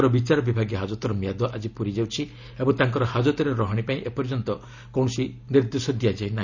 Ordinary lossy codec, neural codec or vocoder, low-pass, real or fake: none; none; 7.2 kHz; real